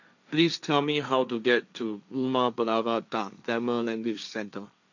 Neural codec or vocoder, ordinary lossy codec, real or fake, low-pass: codec, 16 kHz, 1.1 kbps, Voila-Tokenizer; none; fake; 7.2 kHz